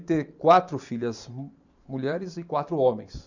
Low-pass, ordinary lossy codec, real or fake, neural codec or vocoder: 7.2 kHz; none; fake; vocoder, 44.1 kHz, 128 mel bands every 512 samples, BigVGAN v2